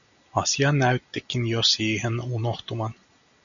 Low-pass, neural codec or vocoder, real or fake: 7.2 kHz; none; real